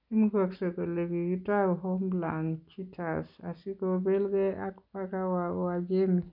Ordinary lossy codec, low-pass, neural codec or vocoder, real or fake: none; 5.4 kHz; none; real